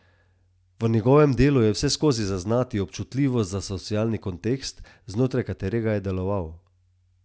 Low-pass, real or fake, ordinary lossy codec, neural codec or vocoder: none; real; none; none